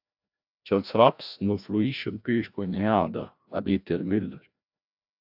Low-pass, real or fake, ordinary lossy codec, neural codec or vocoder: 5.4 kHz; fake; AAC, 48 kbps; codec, 16 kHz, 1 kbps, FreqCodec, larger model